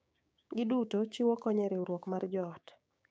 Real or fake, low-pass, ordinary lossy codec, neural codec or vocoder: fake; none; none; codec, 16 kHz, 6 kbps, DAC